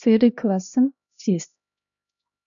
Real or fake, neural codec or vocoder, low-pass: fake; codec, 16 kHz, 1 kbps, X-Codec, HuBERT features, trained on LibriSpeech; 7.2 kHz